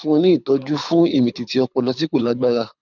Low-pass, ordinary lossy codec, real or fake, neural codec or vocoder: 7.2 kHz; none; fake; codec, 24 kHz, 6 kbps, HILCodec